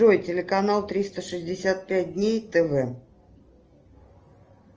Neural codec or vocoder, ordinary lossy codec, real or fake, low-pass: none; Opus, 24 kbps; real; 7.2 kHz